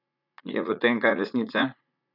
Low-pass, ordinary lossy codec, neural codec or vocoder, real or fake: 5.4 kHz; none; vocoder, 44.1 kHz, 80 mel bands, Vocos; fake